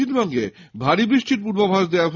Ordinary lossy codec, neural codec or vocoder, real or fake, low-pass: none; none; real; 7.2 kHz